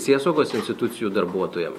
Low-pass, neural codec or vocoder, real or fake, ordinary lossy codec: 19.8 kHz; none; real; MP3, 64 kbps